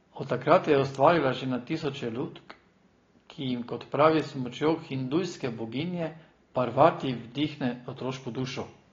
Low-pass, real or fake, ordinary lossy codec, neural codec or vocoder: 7.2 kHz; real; AAC, 32 kbps; none